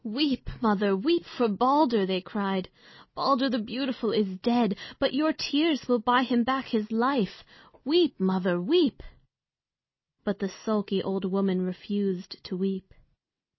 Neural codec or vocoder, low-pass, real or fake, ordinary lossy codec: none; 7.2 kHz; real; MP3, 24 kbps